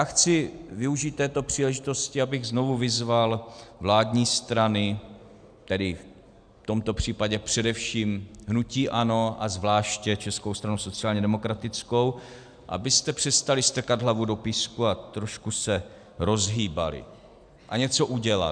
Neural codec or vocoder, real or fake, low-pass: none; real; 9.9 kHz